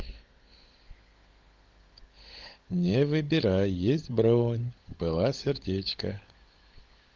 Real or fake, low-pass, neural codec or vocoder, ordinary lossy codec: fake; 7.2 kHz; codec, 16 kHz, 16 kbps, FunCodec, trained on LibriTTS, 50 frames a second; Opus, 32 kbps